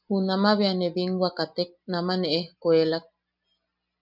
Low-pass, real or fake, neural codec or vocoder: 5.4 kHz; real; none